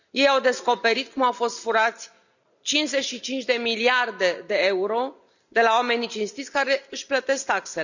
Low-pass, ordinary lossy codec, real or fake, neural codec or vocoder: 7.2 kHz; none; real; none